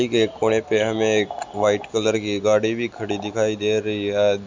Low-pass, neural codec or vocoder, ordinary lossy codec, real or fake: 7.2 kHz; none; MP3, 64 kbps; real